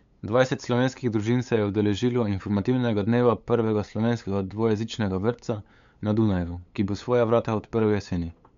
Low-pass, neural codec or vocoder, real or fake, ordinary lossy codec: 7.2 kHz; codec, 16 kHz, 8 kbps, FunCodec, trained on LibriTTS, 25 frames a second; fake; MP3, 64 kbps